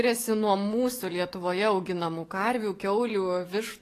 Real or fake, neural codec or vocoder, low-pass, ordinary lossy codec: fake; codec, 44.1 kHz, 7.8 kbps, DAC; 14.4 kHz; AAC, 48 kbps